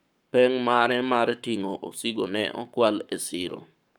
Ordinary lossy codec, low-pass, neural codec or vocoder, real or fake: none; 19.8 kHz; codec, 44.1 kHz, 7.8 kbps, Pupu-Codec; fake